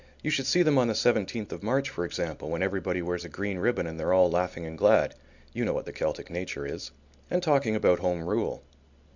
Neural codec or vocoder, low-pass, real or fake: none; 7.2 kHz; real